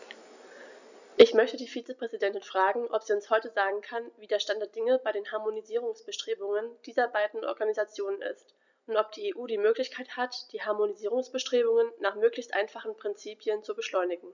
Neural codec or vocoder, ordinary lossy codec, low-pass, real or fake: none; none; 7.2 kHz; real